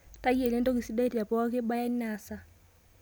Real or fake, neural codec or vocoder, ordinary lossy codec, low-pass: real; none; none; none